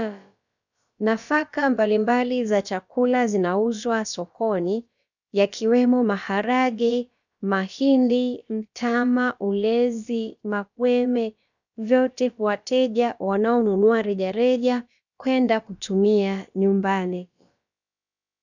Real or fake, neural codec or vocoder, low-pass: fake; codec, 16 kHz, about 1 kbps, DyCAST, with the encoder's durations; 7.2 kHz